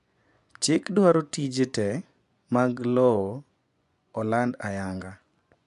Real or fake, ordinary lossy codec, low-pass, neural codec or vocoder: fake; none; 10.8 kHz; vocoder, 24 kHz, 100 mel bands, Vocos